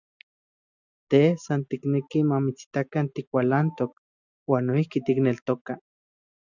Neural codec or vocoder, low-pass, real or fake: none; 7.2 kHz; real